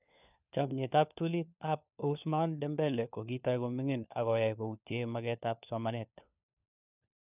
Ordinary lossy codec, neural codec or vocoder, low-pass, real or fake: none; codec, 16 kHz, 4 kbps, FunCodec, trained on LibriTTS, 50 frames a second; 3.6 kHz; fake